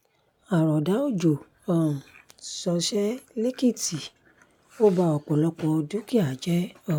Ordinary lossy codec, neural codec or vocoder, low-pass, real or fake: none; none; 19.8 kHz; real